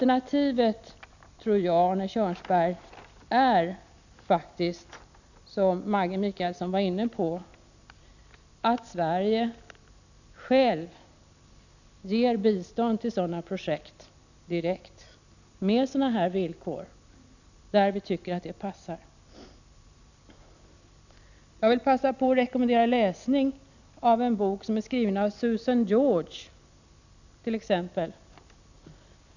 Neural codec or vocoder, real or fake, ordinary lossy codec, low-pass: none; real; none; 7.2 kHz